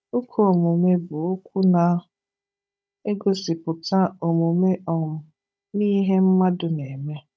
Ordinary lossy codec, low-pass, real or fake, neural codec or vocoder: none; none; fake; codec, 16 kHz, 16 kbps, FunCodec, trained on Chinese and English, 50 frames a second